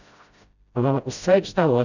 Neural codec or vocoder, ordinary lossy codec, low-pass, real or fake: codec, 16 kHz, 0.5 kbps, FreqCodec, smaller model; none; 7.2 kHz; fake